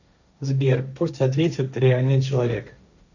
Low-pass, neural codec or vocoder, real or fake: 7.2 kHz; codec, 16 kHz, 1.1 kbps, Voila-Tokenizer; fake